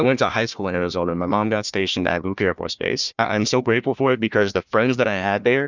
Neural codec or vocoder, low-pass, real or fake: codec, 16 kHz, 1 kbps, FunCodec, trained on Chinese and English, 50 frames a second; 7.2 kHz; fake